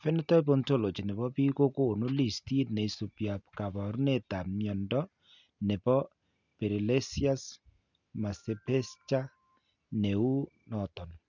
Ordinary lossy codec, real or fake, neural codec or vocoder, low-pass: none; real; none; 7.2 kHz